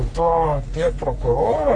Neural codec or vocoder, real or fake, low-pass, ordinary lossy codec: codec, 44.1 kHz, 3.4 kbps, Pupu-Codec; fake; 9.9 kHz; MP3, 48 kbps